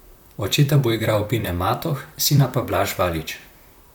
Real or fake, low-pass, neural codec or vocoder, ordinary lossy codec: fake; 19.8 kHz; vocoder, 44.1 kHz, 128 mel bands, Pupu-Vocoder; none